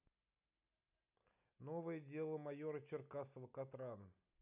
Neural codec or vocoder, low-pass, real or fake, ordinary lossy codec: none; 3.6 kHz; real; none